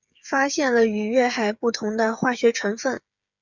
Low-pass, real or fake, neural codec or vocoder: 7.2 kHz; fake; codec, 16 kHz, 8 kbps, FreqCodec, smaller model